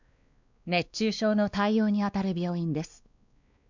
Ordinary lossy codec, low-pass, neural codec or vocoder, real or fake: none; 7.2 kHz; codec, 16 kHz, 2 kbps, X-Codec, WavLM features, trained on Multilingual LibriSpeech; fake